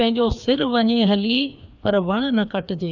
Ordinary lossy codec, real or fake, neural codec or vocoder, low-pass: none; fake; codec, 16 kHz, 2 kbps, FreqCodec, larger model; 7.2 kHz